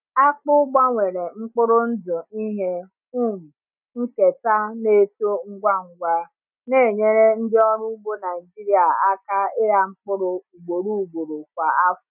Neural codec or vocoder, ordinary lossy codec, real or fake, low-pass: none; none; real; 3.6 kHz